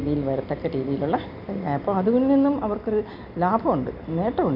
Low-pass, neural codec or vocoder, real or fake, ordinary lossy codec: 5.4 kHz; none; real; none